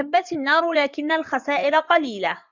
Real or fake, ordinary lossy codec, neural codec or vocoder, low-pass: fake; Opus, 64 kbps; codec, 16 kHz in and 24 kHz out, 2.2 kbps, FireRedTTS-2 codec; 7.2 kHz